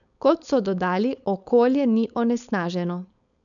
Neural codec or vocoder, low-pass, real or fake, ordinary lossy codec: codec, 16 kHz, 4.8 kbps, FACodec; 7.2 kHz; fake; none